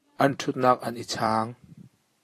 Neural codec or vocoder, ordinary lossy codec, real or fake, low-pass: vocoder, 44.1 kHz, 128 mel bands every 256 samples, BigVGAN v2; AAC, 48 kbps; fake; 14.4 kHz